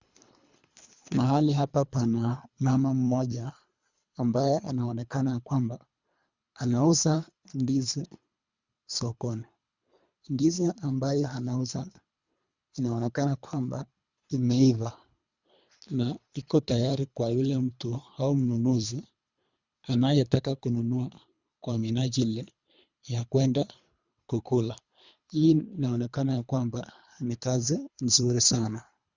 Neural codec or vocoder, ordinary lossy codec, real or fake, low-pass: codec, 24 kHz, 3 kbps, HILCodec; Opus, 64 kbps; fake; 7.2 kHz